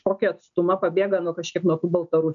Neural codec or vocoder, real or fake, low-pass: none; real; 7.2 kHz